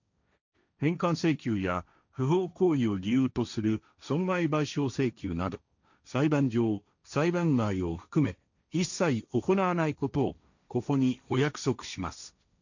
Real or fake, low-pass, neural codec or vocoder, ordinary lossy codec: fake; 7.2 kHz; codec, 16 kHz, 1.1 kbps, Voila-Tokenizer; none